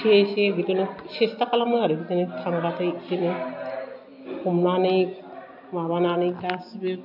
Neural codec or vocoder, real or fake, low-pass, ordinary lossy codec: none; real; 5.4 kHz; none